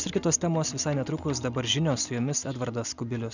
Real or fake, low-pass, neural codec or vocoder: real; 7.2 kHz; none